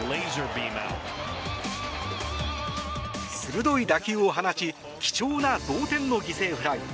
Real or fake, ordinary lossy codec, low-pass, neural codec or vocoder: real; none; none; none